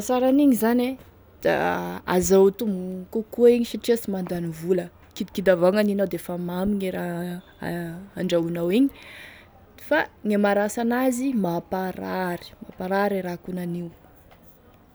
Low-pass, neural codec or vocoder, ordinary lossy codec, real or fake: none; none; none; real